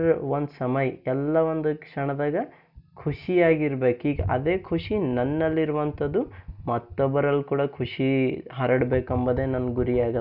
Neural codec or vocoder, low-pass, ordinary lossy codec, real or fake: none; 5.4 kHz; none; real